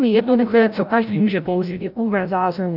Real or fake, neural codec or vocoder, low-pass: fake; codec, 16 kHz, 0.5 kbps, FreqCodec, larger model; 5.4 kHz